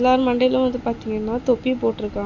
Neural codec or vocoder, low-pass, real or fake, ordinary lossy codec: none; 7.2 kHz; real; none